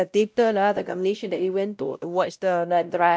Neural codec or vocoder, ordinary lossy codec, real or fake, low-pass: codec, 16 kHz, 0.5 kbps, X-Codec, WavLM features, trained on Multilingual LibriSpeech; none; fake; none